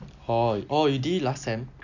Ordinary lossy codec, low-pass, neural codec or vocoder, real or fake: none; 7.2 kHz; none; real